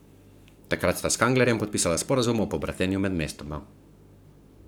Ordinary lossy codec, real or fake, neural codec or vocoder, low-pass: none; fake; codec, 44.1 kHz, 7.8 kbps, Pupu-Codec; none